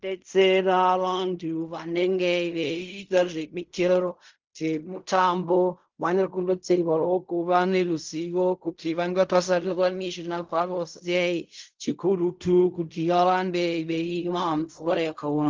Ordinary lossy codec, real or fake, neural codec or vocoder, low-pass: Opus, 32 kbps; fake; codec, 16 kHz in and 24 kHz out, 0.4 kbps, LongCat-Audio-Codec, fine tuned four codebook decoder; 7.2 kHz